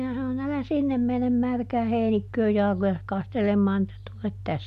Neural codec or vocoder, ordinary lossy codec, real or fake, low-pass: none; none; real; 14.4 kHz